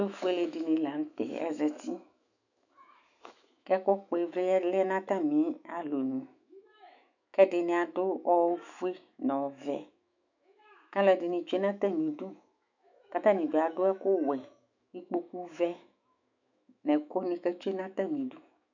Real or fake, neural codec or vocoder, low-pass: fake; autoencoder, 48 kHz, 128 numbers a frame, DAC-VAE, trained on Japanese speech; 7.2 kHz